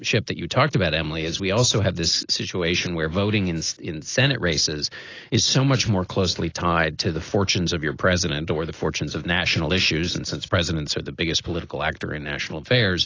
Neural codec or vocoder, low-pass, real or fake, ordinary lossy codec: none; 7.2 kHz; real; AAC, 32 kbps